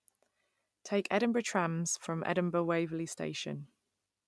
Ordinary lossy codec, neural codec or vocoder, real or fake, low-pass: none; none; real; none